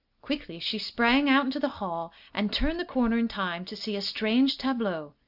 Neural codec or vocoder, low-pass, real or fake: none; 5.4 kHz; real